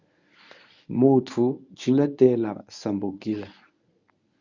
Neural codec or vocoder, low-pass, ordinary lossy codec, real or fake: codec, 24 kHz, 0.9 kbps, WavTokenizer, medium speech release version 1; 7.2 kHz; Opus, 64 kbps; fake